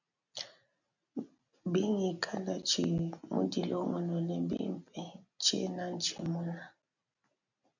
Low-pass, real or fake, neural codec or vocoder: 7.2 kHz; real; none